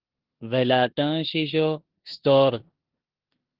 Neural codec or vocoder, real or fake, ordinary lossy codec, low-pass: codec, 16 kHz in and 24 kHz out, 0.9 kbps, LongCat-Audio-Codec, four codebook decoder; fake; Opus, 16 kbps; 5.4 kHz